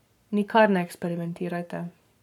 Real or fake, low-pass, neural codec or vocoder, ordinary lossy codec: fake; 19.8 kHz; codec, 44.1 kHz, 7.8 kbps, Pupu-Codec; none